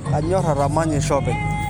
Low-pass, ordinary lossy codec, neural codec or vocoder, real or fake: none; none; none; real